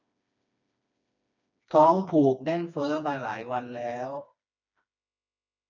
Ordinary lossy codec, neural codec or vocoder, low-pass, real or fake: none; codec, 16 kHz, 2 kbps, FreqCodec, smaller model; 7.2 kHz; fake